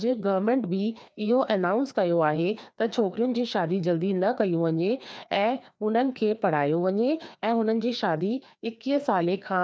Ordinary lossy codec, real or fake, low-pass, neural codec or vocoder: none; fake; none; codec, 16 kHz, 2 kbps, FreqCodec, larger model